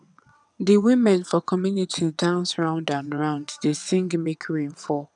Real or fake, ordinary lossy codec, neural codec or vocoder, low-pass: fake; none; vocoder, 22.05 kHz, 80 mel bands, WaveNeXt; 9.9 kHz